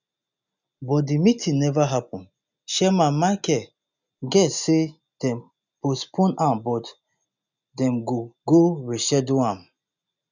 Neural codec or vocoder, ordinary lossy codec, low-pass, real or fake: none; none; 7.2 kHz; real